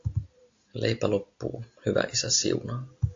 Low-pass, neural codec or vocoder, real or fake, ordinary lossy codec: 7.2 kHz; none; real; AAC, 48 kbps